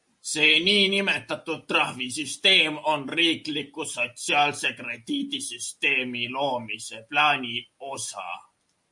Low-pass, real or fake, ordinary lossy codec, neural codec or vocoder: 10.8 kHz; real; MP3, 48 kbps; none